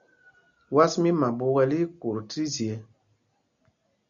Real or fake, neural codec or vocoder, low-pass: real; none; 7.2 kHz